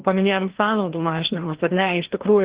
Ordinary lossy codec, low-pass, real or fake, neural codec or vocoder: Opus, 16 kbps; 3.6 kHz; fake; codec, 16 kHz, 1 kbps, FreqCodec, larger model